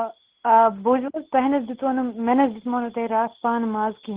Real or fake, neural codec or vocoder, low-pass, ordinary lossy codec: real; none; 3.6 kHz; Opus, 16 kbps